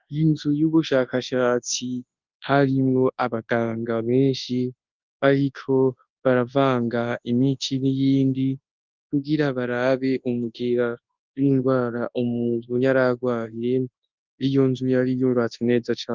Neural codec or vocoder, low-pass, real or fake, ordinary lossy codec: codec, 24 kHz, 0.9 kbps, WavTokenizer, large speech release; 7.2 kHz; fake; Opus, 32 kbps